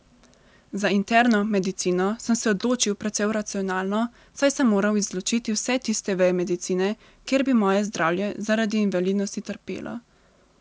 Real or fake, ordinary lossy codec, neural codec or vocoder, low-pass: real; none; none; none